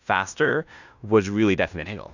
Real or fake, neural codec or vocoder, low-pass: fake; codec, 16 kHz in and 24 kHz out, 0.9 kbps, LongCat-Audio-Codec, fine tuned four codebook decoder; 7.2 kHz